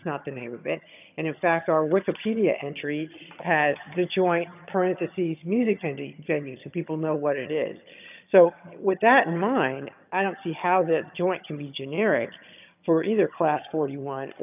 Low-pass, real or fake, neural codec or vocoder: 3.6 kHz; fake; vocoder, 22.05 kHz, 80 mel bands, HiFi-GAN